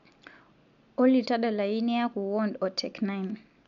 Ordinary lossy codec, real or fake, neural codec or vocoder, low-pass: none; real; none; 7.2 kHz